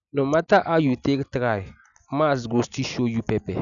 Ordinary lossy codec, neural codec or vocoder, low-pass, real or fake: none; none; 7.2 kHz; real